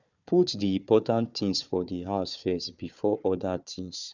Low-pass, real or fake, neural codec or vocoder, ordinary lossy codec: 7.2 kHz; fake; codec, 16 kHz, 4 kbps, FunCodec, trained on Chinese and English, 50 frames a second; none